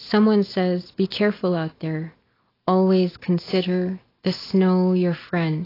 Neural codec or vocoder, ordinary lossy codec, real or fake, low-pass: none; AAC, 24 kbps; real; 5.4 kHz